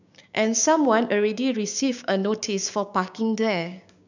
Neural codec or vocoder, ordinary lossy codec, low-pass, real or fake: codec, 16 kHz, 6 kbps, DAC; none; 7.2 kHz; fake